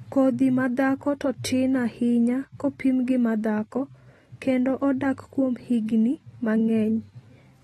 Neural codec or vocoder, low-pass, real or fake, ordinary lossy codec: none; 19.8 kHz; real; AAC, 32 kbps